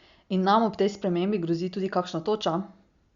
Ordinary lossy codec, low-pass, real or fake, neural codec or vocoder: Opus, 64 kbps; 7.2 kHz; real; none